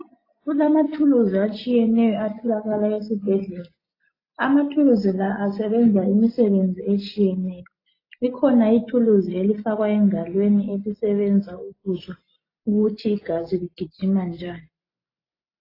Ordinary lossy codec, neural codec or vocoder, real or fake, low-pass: AAC, 24 kbps; none; real; 5.4 kHz